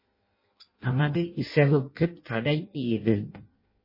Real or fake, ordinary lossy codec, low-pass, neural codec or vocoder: fake; MP3, 24 kbps; 5.4 kHz; codec, 16 kHz in and 24 kHz out, 0.6 kbps, FireRedTTS-2 codec